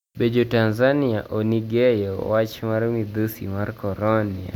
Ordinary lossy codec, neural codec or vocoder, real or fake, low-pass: none; none; real; 19.8 kHz